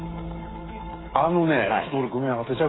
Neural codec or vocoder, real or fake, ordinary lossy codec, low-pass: codec, 16 kHz, 8 kbps, FreqCodec, smaller model; fake; AAC, 16 kbps; 7.2 kHz